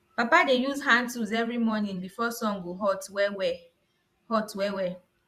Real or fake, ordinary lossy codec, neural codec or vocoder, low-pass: fake; Opus, 64 kbps; vocoder, 44.1 kHz, 128 mel bands every 512 samples, BigVGAN v2; 14.4 kHz